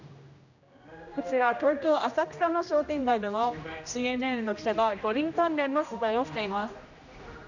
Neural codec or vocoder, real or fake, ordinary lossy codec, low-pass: codec, 16 kHz, 1 kbps, X-Codec, HuBERT features, trained on general audio; fake; none; 7.2 kHz